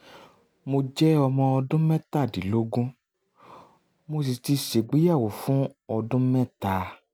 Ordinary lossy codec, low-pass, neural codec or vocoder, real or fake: none; none; none; real